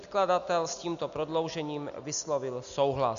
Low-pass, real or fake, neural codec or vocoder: 7.2 kHz; real; none